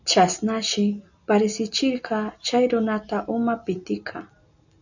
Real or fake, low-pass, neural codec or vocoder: real; 7.2 kHz; none